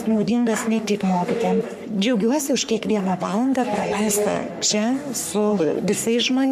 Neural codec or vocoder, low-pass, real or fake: codec, 44.1 kHz, 3.4 kbps, Pupu-Codec; 14.4 kHz; fake